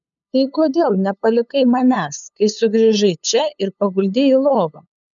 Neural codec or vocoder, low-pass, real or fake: codec, 16 kHz, 8 kbps, FunCodec, trained on LibriTTS, 25 frames a second; 7.2 kHz; fake